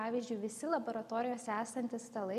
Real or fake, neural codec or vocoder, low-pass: real; none; 14.4 kHz